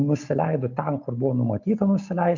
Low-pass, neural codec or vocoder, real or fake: 7.2 kHz; none; real